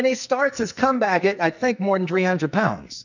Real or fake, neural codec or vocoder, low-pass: fake; codec, 44.1 kHz, 2.6 kbps, SNAC; 7.2 kHz